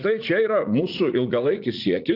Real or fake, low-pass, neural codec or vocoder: fake; 5.4 kHz; vocoder, 44.1 kHz, 80 mel bands, Vocos